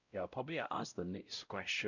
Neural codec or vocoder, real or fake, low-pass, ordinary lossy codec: codec, 16 kHz, 0.5 kbps, X-Codec, WavLM features, trained on Multilingual LibriSpeech; fake; 7.2 kHz; none